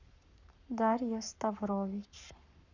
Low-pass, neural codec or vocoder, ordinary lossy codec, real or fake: 7.2 kHz; codec, 44.1 kHz, 7.8 kbps, Pupu-Codec; none; fake